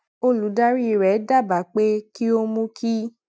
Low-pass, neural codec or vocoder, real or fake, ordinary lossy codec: none; none; real; none